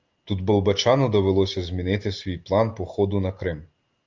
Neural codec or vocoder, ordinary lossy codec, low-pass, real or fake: none; Opus, 24 kbps; 7.2 kHz; real